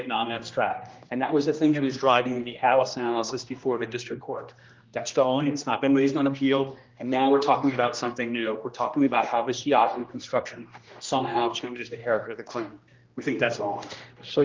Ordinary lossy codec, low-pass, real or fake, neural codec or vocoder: Opus, 32 kbps; 7.2 kHz; fake; codec, 16 kHz, 1 kbps, X-Codec, HuBERT features, trained on general audio